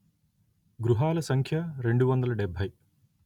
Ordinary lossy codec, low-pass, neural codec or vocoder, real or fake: none; 19.8 kHz; none; real